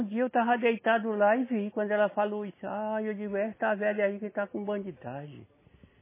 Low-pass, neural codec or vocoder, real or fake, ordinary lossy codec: 3.6 kHz; none; real; MP3, 16 kbps